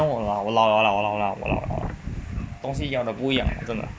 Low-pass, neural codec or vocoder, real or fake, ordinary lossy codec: none; none; real; none